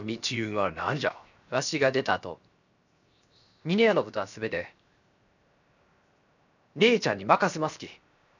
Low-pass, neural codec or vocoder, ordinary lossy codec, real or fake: 7.2 kHz; codec, 16 kHz, 0.7 kbps, FocalCodec; none; fake